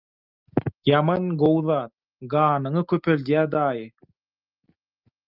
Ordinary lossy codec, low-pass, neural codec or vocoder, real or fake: Opus, 32 kbps; 5.4 kHz; none; real